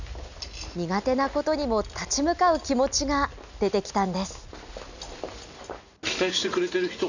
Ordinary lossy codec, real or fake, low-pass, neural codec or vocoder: none; real; 7.2 kHz; none